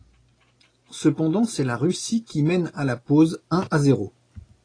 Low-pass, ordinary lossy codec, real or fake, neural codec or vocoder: 9.9 kHz; AAC, 32 kbps; real; none